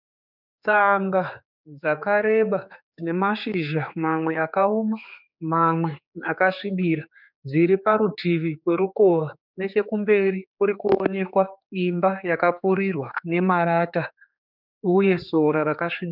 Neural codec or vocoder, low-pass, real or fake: codec, 16 kHz, 4 kbps, X-Codec, HuBERT features, trained on general audio; 5.4 kHz; fake